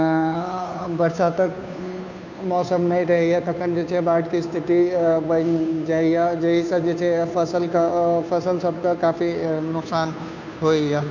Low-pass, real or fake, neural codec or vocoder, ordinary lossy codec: 7.2 kHz; fake; codec, 16 kHz, 2 kbps, FunCodec, trained on Chinese and English, 25 frames a second; none